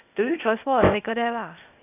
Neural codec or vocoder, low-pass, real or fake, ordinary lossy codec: codec, 16 kHz, 0.8 kbps, ZipCodec; 3.6 kHz; fake; none